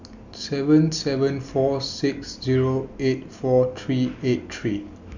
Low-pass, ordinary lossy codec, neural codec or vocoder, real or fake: 7.2 kHz; none; none; real